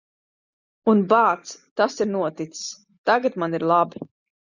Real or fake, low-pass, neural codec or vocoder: real; 7.2 kHz; none